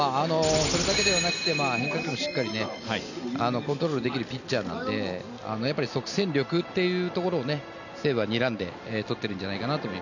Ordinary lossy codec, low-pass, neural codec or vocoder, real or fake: none; 7.2 kHz; none; real